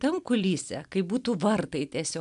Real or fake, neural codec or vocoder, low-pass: real; none; 10.8 kHz